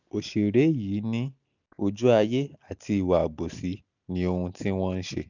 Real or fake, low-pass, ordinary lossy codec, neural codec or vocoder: real; 7.2 kHz; none; none